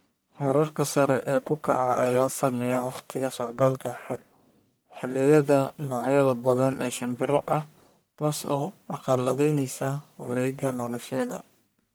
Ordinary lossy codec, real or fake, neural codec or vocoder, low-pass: none; fake; codec, 44.1 kHz, 1.7 kbps, Pupu-Codec; none